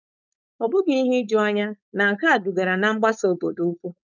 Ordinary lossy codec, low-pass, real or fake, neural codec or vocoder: none; 7.2 kHz; fake; codec, 16 kHz, 4.8 kbps, FACodec